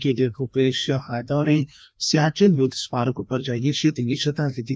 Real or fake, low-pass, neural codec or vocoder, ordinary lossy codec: fake; none; codec, 16 kHz, 1 kbps, FreqCodec, larger model; none